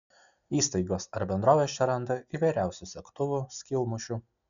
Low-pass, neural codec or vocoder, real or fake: 7.2 kHz; none; real